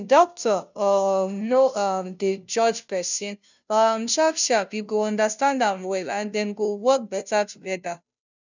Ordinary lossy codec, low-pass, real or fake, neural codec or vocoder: none; 7.2 kHz; fake; codec, 16 kHz, 0.5 kbps, FunCodec, trained on Chinese and English, 25 frames a second